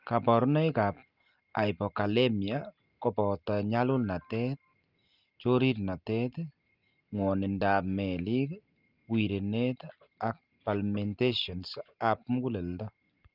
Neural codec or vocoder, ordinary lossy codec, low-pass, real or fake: none; Opus, 24 kbps; 5.4 kHz; real